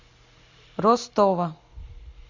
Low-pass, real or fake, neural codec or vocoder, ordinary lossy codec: 7.2 kHz; real; none; MP3, 64 kbps